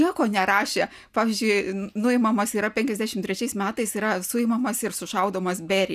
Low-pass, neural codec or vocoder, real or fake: 14.4 kHz; none; real